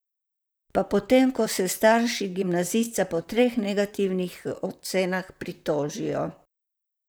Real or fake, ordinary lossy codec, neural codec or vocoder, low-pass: fake; none; vocoder, 44.1 kHz, 128 mel bands, Pupu-Vocoder; none